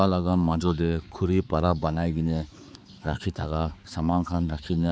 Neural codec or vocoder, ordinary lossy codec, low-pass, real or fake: codec, 16 kHz, 4 kbps, X-Codec, HuBERT features, trained on balanced general audio; none; none; fake